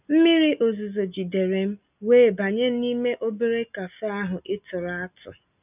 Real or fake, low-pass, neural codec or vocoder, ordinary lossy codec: real; 3.6 kHz; none; none